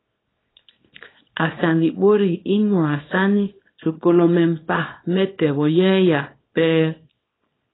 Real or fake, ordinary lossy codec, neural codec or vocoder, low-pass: fake; AAC, 16 kbps; codec, 24 kHz, 0.9 kbps, WavTokenizer, small release; 7.2 kHz